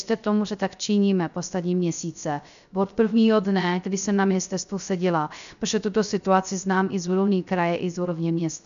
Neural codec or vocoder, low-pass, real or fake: codec, 16 kHz, 0.3 kbps, FocalCodec; 7.2 kHz; fake